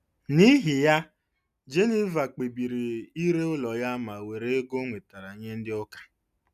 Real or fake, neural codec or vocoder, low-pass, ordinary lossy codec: real; none; 14.4 kHz; none